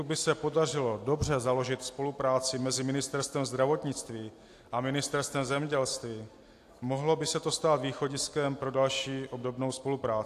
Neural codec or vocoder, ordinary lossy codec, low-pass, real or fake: none; AAC, 64 kbps; 14.4 kHz; real